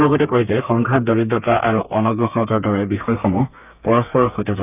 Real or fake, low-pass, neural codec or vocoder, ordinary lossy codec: fake; 3.6 kHz; codec, 32 kHz, 1.9 kbps, SNAC; none